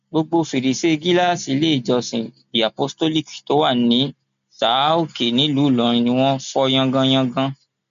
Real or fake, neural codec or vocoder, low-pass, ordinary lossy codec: real; none; 7.2 kHz; MP3, 64 kbps